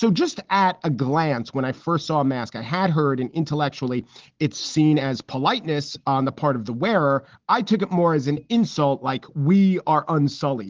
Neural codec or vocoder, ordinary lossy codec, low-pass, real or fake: none; Opus, 16 kbps; 7.2 kHz; real